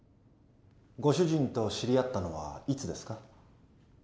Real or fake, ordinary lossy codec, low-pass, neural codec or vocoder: real; none; none; none